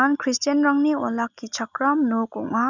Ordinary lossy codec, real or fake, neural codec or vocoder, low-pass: none; real; none; 7.2 kHz